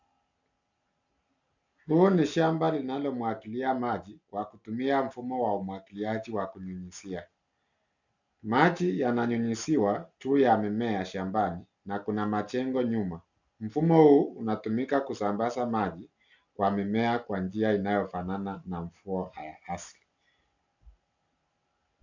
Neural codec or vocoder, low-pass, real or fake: none; 7.2 kHz; real